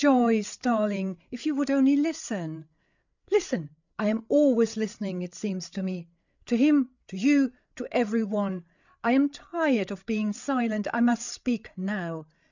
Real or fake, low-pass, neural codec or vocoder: fake; 7.2 kHz; codec, 16 kHz, 8 kbps, FreqCodec, larger model